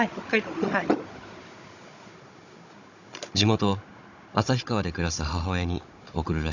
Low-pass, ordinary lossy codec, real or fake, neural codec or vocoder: 7.2 kHz; none; fake; codec, 16 kHz, 16 kbps, FunCodec, trained on Chinese and English, 50 frames a second